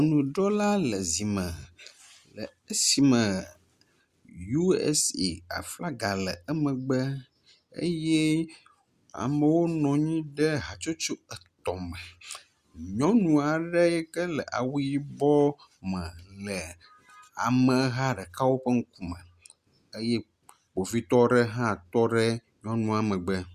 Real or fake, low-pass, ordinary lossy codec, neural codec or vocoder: real; 14.4 kHz; Opus, 64 kbps; none